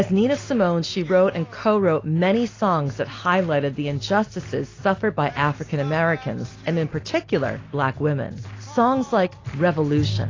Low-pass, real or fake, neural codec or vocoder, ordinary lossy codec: 7.2 kHz; real; none; AAC, 32 kbps